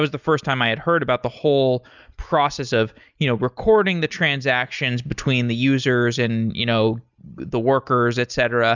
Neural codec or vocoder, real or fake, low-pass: none; real; 7.2 kHz